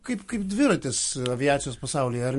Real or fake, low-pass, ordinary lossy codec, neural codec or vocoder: real; 14.4 kHz; MP3, 48 kbps; none